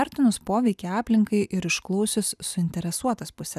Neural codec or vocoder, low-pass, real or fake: none; 14.4 kHz; real